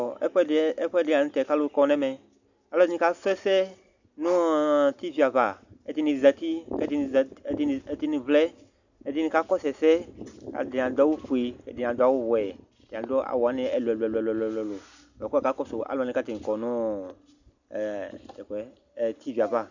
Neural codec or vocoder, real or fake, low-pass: none; real; 7.2 kHz